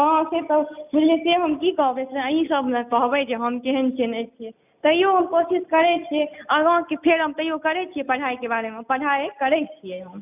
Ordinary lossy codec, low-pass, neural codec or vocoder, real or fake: none; 3.6 kHz; none; real